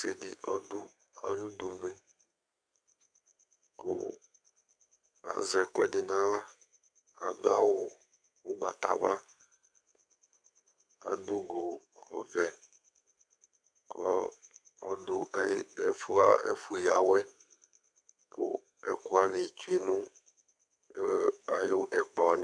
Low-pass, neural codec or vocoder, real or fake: 9.9 kHz; codec, 32 kHz, 1.9 kbps, SNAC; fake